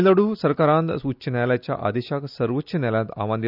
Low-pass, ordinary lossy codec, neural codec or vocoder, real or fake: 5.4 kHz; none; none; real